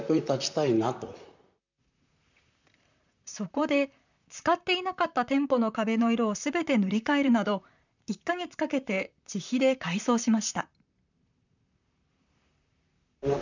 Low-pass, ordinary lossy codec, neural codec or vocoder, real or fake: 7.2 kHz; none; vocoder, 44.1 kHz, 128 mel bands, Pupu-Vocoder; fake